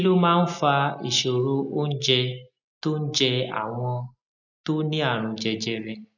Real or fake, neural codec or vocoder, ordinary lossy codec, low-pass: real; none; none; 7.2 kHz